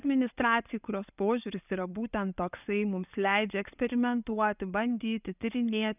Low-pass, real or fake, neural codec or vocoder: 3.6 kHz; real; none